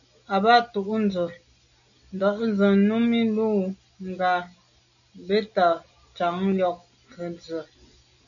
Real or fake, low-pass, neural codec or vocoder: real; 7.2 kHz; none